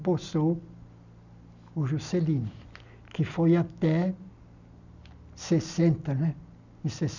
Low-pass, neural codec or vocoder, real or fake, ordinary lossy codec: 7.2 kHz; none; real; none